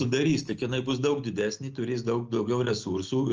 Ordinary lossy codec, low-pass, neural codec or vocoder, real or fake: Opus, 24 kbps; 7.2 kHz; none; real